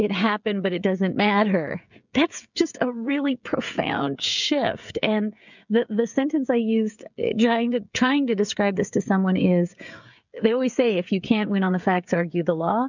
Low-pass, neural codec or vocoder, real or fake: 7.2 kHz; codec, 16 kHz, 16 kbps, FreqCodec, smaller model; fake